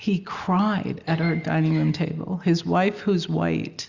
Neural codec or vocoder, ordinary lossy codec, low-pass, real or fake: none; Opus, 64 kbps; 7.2 kHz; real